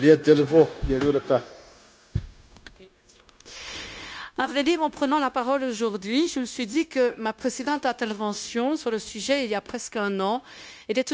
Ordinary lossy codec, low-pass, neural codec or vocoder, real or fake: none; none; codec, 16 kHz, 0.9 kbps, LongCat-Audio-Codec; fake